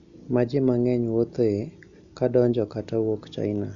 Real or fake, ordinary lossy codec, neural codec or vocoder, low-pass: real; none; none; 7.2 kHz